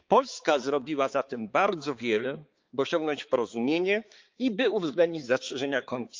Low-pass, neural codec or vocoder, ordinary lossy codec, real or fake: 7.2 kHz; codec, 16 kHz, 4 kbps, X-Codec, HuBERT features, trained on balanced general audio; Opus, 32 kbps; fake